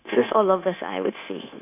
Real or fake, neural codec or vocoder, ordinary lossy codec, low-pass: fake; codec, 16 kHz, 0.9 kbps, LongCat-Audio-Codec; none; 3.6 kHz